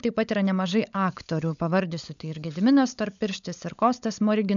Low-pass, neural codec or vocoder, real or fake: 7.2 kHz; codec, 16 kHz, 16 kbps, FunCodec, trained on LibriTTS, 50 frames a second; fake